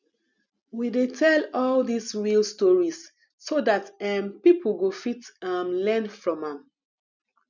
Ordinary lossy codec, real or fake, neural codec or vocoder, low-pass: none; real; none; 7.2 kHz